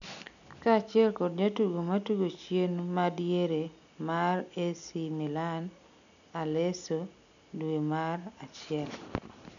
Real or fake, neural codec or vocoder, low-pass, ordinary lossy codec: real; none; 7.2 kHz; none